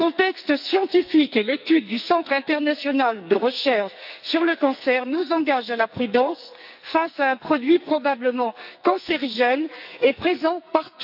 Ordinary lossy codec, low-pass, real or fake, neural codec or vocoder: none; 5.4 kHz; fake; codec, 44.1 kHz, 2.6 kbps, SNAC